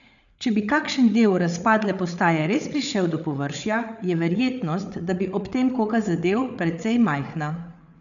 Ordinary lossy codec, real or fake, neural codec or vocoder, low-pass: none; fake; codec, 16 kHz, 8 kbps, FreqCodec, larger model; 7.2 kHz